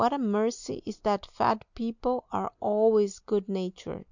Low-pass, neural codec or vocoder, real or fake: 7.2 kHz; none; real